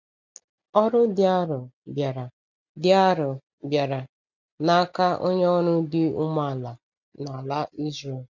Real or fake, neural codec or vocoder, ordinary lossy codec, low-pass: real; none; none; 7.2 kHz